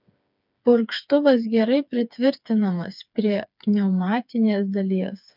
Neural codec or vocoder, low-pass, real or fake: codec, 16 kHz, 4 kbps, FreqCodec, smaller model; 5.4 kHz; fake